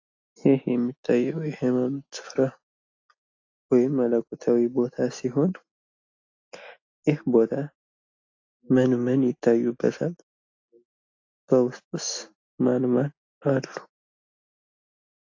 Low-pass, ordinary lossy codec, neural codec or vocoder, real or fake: 7.2 kHz; AAC, 48 kbps; none; real